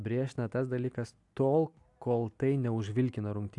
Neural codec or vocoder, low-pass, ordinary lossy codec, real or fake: none; 10.8 kHz; AAC, 64 kbps; real